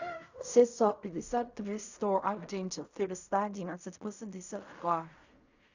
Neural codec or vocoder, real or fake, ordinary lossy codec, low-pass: codec, 16 kHz in and 24 kHz out, 0.4 kbps, LongCat-Audio-Codec, fine tuned four codebook decoder; fake; Opus, 64 kbps; 7.2 kHz